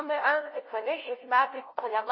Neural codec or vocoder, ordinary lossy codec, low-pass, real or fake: codec, 16 kHz, 0.5 kbps, FunCodec, trained on LibriTTS, 25 frames a second; MP3, 24 kbps; 7.2 kHz; fake